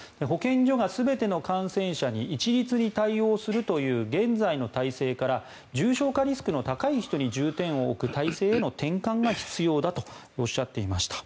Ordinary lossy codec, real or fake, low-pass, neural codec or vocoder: none; real; none; none